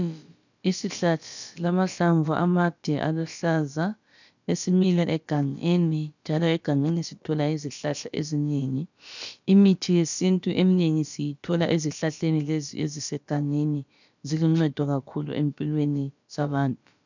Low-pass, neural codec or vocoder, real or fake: 7.2 kHz; codec, 16 kHz, about 1 kbps, DyCAST, with the encoder's durations; fake